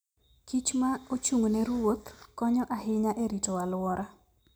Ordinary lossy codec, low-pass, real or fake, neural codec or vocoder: none; none; real; none